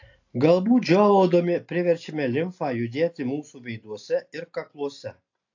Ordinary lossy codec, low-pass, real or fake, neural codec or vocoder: AAC, 48 kbps; 7.2 kHz; real; none